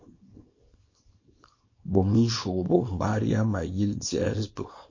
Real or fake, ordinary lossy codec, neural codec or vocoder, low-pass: fake; MP3, 32 kbps; codec, 24 kHz, 0.9 kbps, WavTokenizer, small release; 7.2 kHz